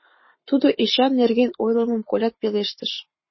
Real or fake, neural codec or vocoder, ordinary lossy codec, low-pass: fake; codec, 44.1 kHz, 7.8 kbps, Pupu-Codec; MP3, 24 kbps; 7.2 kHz